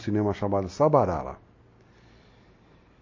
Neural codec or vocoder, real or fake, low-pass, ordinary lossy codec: none; real; 7.2 kHz; MP3, 32 kbps